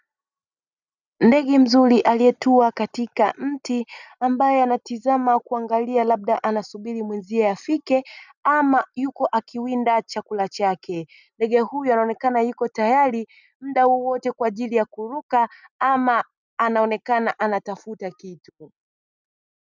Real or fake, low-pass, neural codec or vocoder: real; 7.2 kHz; none